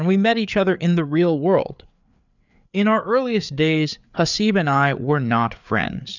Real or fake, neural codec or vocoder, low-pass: fake; codec, 16 kHz, 4 kbps, FreqCodec, larger model; 7.2 kHz